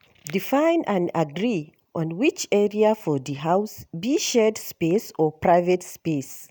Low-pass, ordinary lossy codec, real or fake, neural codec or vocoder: none; none; real; none